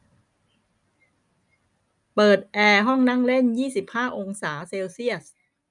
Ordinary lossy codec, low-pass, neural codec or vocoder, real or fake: none; 10.8 kHz; none; real